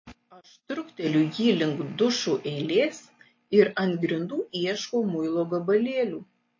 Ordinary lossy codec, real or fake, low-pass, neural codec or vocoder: MP3, 32 kbps; real; 7.2 kHz; none